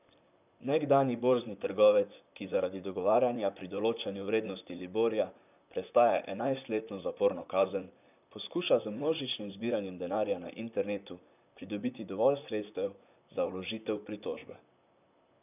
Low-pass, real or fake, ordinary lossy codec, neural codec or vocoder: 3.6 kHz; fake; none; vocoder, 44.1 kHz, 128 mel bands, Pupu-Vocoder